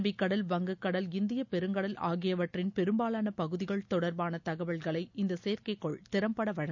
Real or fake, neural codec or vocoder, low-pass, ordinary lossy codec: real; none; 7.2 kHz; none